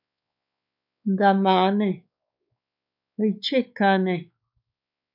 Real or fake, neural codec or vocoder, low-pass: fake; codec, 16 kHz, 4 kbps, X-Codec, WavLM features, trained on Multilingual LibriSpeech; 5.4 kHz